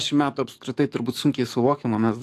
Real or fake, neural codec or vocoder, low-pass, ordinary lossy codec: fake; codec, 44.1 kHz, 7.8 kbps, DAC; 14.4 kHz; AAC, 64 kbps